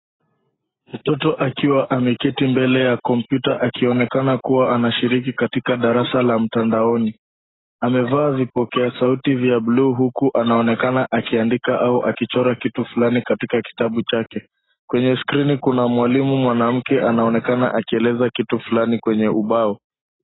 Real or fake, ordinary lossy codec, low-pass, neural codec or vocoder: real; AAC, 16 kbps; 7.2 kHz; none